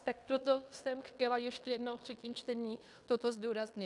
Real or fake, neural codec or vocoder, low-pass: fake; codec, 16 kHz in and 24 kHz out, 0.9 kbps, LongCat-Audio-Codec, fine tuned four codebook decoder; 10.8 kHz